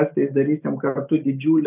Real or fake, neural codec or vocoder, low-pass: real; none; 3.6 kHz